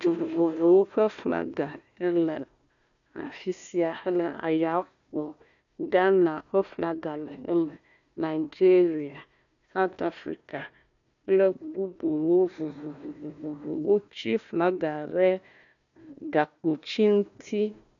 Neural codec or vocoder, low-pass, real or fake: codec, 16 kHz, 1 kbps, FunCodec, trained on Chinese and English, 50 frames a second; 7.2 kHz; fake